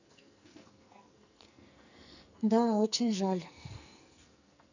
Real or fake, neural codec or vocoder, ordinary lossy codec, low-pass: fake; codec, 44.1 kHz, 2.6 kbps, SNAC; none; 7.2 kHz